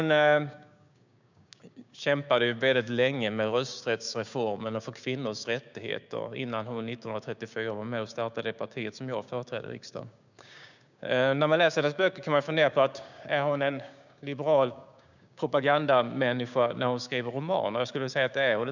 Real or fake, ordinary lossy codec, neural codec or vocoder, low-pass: fake; none; codec, 16 kHz, 6 kbps, DAC; 7.2 kHz